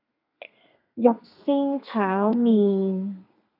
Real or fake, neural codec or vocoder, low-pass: fake; codec, 32 kHz, 1.9 kbps, SNAC; 5.4 kHz